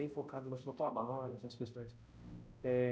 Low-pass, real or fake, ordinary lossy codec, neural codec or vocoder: none; fake; none; codec, 16 kHz, 0.5 kbps, X-Codec, HuBERT features, trained on balanced general audio